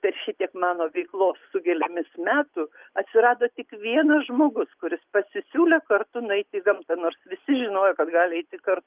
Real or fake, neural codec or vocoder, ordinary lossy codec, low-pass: real; none; Opus, 24 kbps; 3.6 kHz